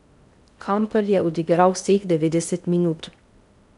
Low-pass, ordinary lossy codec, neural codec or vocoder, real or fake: 10.8 kHz; none; codec, 16 kHz in and 24 kHz out, 0.6 kbps, FocalCodec, streaming, 4096 codes; fake